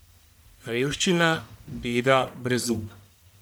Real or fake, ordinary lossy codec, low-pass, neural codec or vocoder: fake; none; none; codec, 44.1 kHz, 1.7 kbps, Pupu-Codec